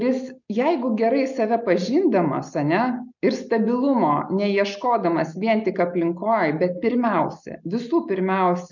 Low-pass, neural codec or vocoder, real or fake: 7.2 kHz; none; real